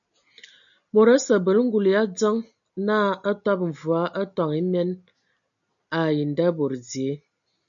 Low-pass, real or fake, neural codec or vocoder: 7.2 kHz; real; none